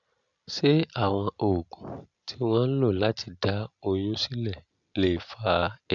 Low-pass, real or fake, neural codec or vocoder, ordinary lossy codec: 7.2 kHz; real; none; AAC, 64 kbps